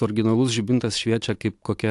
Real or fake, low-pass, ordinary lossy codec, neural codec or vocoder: real; 10.8 kHz; AAC, 64 kbps; none